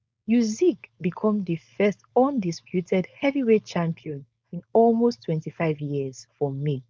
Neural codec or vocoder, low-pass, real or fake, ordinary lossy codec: codec, 16 kHz, 4.8 kbps, FACodec; none; fake; none